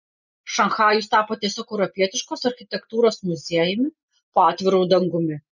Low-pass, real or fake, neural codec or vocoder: 7.2 kHz; real; none